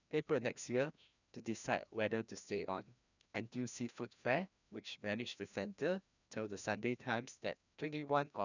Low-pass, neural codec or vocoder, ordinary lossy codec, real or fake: 7.2 kHz; codec, 16 kHz, 1 kbps, FreqCodec, larger model; none; fake